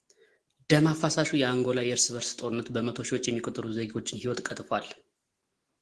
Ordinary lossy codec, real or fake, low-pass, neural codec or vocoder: Opus, 16 kbps; fake; 10.8 kHz; autoencoder, 48 kHz, 128 numbers a frame, DAC-VAE, trained on Japanese speech